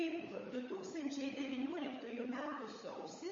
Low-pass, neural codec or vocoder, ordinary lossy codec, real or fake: 7.2 kHz; codec, 16 kHz, 16 kbps, FunCodec, trained on LibriTTS, 50 frames a second; MP3, 32 kbps; fake